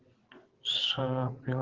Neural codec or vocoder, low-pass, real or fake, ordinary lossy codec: vocoder, 22.05 kHz, 80 mel bands, WaveNeXt; 7.2 kHz; fake; Opus, 16 kbps